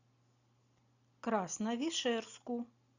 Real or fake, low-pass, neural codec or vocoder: real; 7.2 kHz; none